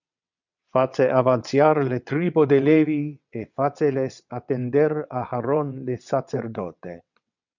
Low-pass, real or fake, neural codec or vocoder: 7.2 kHz; fake; vocoder, 22.05 kHz, 80 mel bands, WaveNeXt